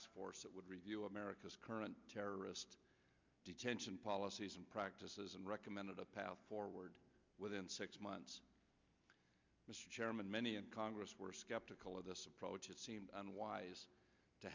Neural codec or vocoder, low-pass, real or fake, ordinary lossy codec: none; 7.2 kHz; real; MP3, 64 kbps